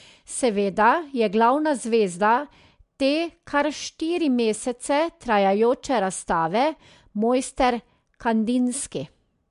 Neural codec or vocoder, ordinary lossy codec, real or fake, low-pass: none; MP3, 64 kbps; real; 10.8 kHz